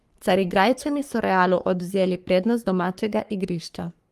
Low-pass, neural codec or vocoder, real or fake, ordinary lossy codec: 14.4 kHz; codec, 44.1 kHz, 3.4 kbps, Pupu-Codec; fake; Opus, 32 kbps